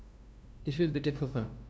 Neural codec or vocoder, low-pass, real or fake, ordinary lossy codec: codec, 16 kHz, 0.5 kbps, FunCodec, trained on LibriTTS, 25 frames a second; none; fake; none